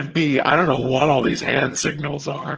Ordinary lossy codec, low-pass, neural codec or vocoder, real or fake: Opus, 16 kbps; 7.2 kHz; vocoder, 22.05 kHz, 80 mel bands, HiFi-GAN; fake